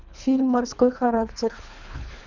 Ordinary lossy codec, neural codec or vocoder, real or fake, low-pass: none; codec, 24 kHz, 3 kbps, HILCodec; fake; 7.2 kHz